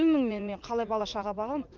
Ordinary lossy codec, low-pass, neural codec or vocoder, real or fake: Opus, 24 kbps; 7.2 kHz; vocoder, 44.1 kHz, 80 mel bands, Vocos; fake